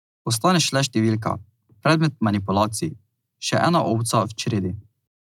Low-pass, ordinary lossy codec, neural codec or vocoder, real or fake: 19.8 kHz; none; none; real